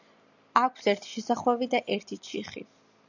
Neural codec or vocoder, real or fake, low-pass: none; real; 7.2 kHz